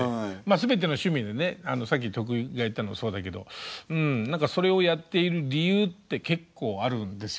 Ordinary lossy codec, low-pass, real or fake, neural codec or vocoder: none; none; real; none